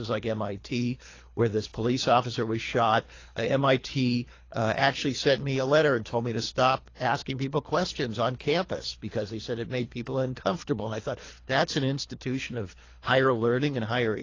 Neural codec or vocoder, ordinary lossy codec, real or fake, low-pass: codec, 24 kHz, 3 kbps, HILCodec; AAC, 32 kbps; fake; 7.2 kHz